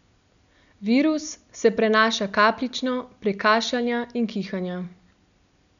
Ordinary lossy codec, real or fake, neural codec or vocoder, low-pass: MP3, 96 kbps; real; none; 7.2 kHz